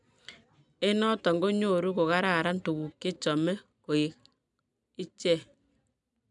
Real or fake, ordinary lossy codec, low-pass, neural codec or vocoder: real; none; 10.8 kHz; none